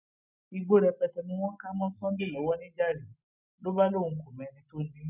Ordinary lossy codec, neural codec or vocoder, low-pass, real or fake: none; none; 3.6 kHz; real